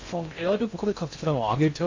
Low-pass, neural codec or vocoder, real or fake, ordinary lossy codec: 7.2 kHz; codec, 16 kHz in and 24 kHz out, 0.6 kbps, FocalCodec, streaming, 2048 codes; fake; MP3, 64 kbps